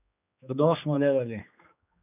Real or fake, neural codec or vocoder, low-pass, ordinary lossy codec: fake; codec, 16 kHz, 2 kbps, X-Codec, HuBERT features, trained on general audio; 3.6 kHz; none